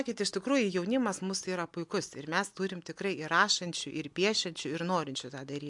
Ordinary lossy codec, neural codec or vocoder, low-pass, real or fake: MP3, 64 kbps; none; 10.8 kHz; real